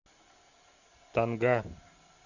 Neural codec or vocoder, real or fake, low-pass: none; real; 7.2 kHz